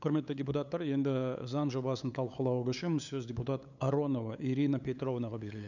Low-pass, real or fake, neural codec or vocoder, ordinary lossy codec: 7.2 kHz; fake; codec, 16 kHz, 8 kbps, FunCodec, trained on LibriTTS, 25 frames a second; none